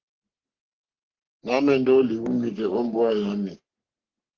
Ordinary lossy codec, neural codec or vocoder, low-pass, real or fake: Opus, 16 kbps; codec, 44.1 kHz, 3.4 kbps, Pupu-Codec; 7.2 kHz; fake